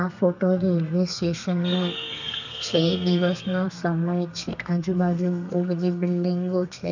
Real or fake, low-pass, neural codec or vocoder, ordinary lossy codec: fake; 7.2 kHz; codec, 32 kHz, 1.9 kbps, SNAC; none